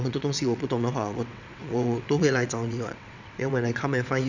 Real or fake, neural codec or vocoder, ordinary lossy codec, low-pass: fake; vocoder, 22.05 kHz, 80 mel bands, WaveNeXt; none; 7.2 kHz